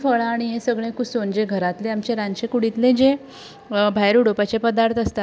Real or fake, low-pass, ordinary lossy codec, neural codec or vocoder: real; none; none; none